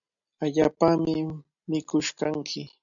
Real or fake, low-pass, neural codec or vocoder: real; 7.2 kHz; none